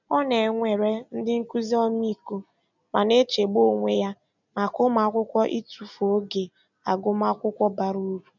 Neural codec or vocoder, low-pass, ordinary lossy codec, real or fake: none; 7.2 kHz; none; real